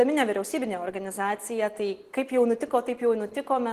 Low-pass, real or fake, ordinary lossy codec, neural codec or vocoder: 14.4 kHz; real; Opus, 16 kbps; none